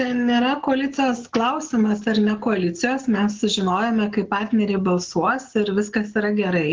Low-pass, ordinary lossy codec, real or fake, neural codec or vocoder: 7.2 kHz; Opus, 16 kbps; real; none